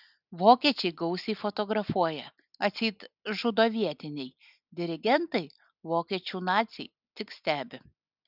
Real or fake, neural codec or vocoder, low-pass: real; none; 5.4 kHz